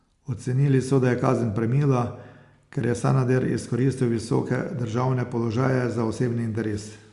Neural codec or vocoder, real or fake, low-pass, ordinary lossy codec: none; real; 10.8 kHz; Opus, 64 kbps